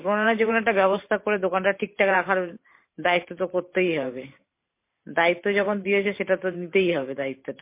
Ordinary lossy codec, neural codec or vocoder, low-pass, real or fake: MP3, 24 kbps; vocoder, 44.1 kHz, 128 mel bands every 512 samples, BigVGAN v2; 3.6 kHz; fake